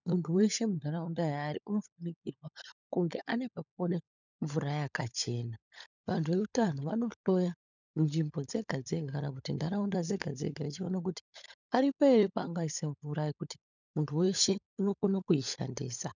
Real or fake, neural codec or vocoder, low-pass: fake; codec, 16 kHz, 16 kbps, FunCodec, trained on LibriTTS, 50 frames a second; 7.2 kHz